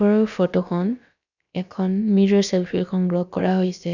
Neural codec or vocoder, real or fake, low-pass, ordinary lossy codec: codec, 16 kHz, about 1 kbps, DyCAST, with the encoder's durations; fake; 7.2 kHz; none